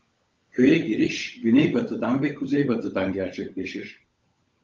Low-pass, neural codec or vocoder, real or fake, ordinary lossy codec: 7.2 kHz; codec, 16 kHz, 16 kbps, FunCodec, trained on LibriTTS, 50 frames a second; fake; Opus, 24 kbps